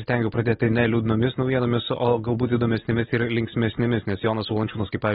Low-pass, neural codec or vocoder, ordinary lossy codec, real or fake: 10.8 kHz; none; AAC, 16 kbps; real